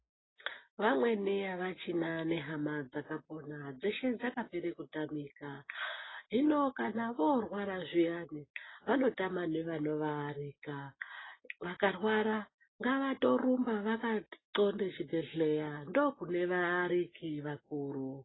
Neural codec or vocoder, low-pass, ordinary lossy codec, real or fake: none; 7.2 kHz; AAC, 16 kbps; real